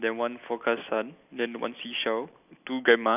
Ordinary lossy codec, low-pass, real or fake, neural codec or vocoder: none; 3.6 kHz; real; none